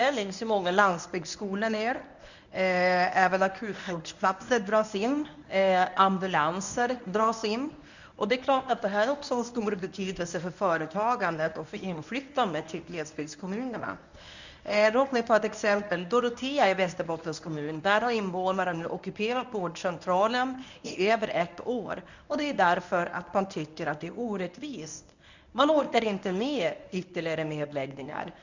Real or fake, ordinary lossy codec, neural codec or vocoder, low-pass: fake; none; codec, 24 kHz, 0.9 kbps, WavTokenizer, medium speech release version 1; 7.2 kHz